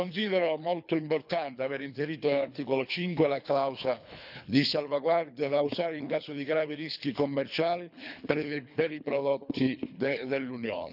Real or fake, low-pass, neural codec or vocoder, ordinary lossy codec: fake; 5.4 kHz; codec, 24 kHz, 3 kbps, HILCodec; none